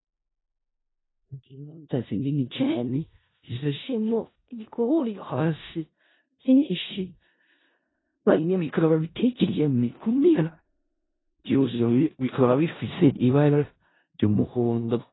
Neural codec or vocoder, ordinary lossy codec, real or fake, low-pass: codec, 16 kHz in and 24 kHz out, 0.4 kbps, LongCat-Audio-Codec, four codebook decoder; AAC, 16 kbps; fake; 7.2 kHz